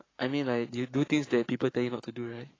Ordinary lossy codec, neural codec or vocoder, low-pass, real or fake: AAC, 32 kbps; codec, 44.1 kHz, 7.8 kbps, Pupu-Codec; 7.2 kHz; fake